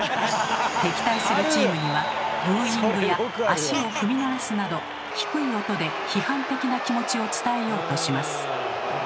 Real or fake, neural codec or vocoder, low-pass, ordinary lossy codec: real; none; none; none